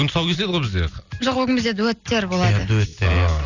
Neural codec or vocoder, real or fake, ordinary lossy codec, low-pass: none; real; none; 7.2 kHz